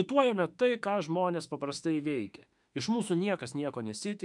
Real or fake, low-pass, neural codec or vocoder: fake; 10.8 kHz; autoencoder, 48 kHz, 32 numbers a frame, DAC-VAE, trained on Japanese speech